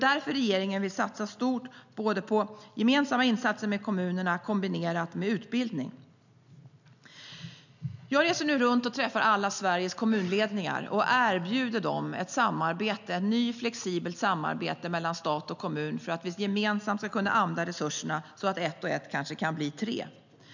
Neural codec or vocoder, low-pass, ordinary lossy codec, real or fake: none; 7.2 kHz; none; real